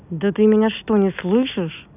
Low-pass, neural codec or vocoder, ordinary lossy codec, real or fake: 3.6 kHz; none; none; real